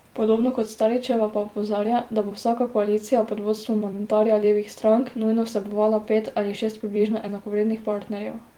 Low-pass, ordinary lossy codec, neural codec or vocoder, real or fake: 19.8 kHz; Opus, 24 kbps; vocoder, 44.1 kHz, 128 mel bands every 256 samples, BigVGAN v2; fake